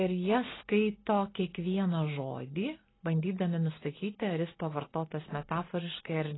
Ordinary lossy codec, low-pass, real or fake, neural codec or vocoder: AAC, 16 kbps; 7.2 kHz; real; none